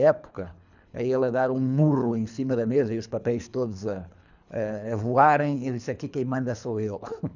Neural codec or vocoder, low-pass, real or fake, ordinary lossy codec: codec, 24 kHz, 3 kbps, HILCodec; 7.2 kHz; fake; none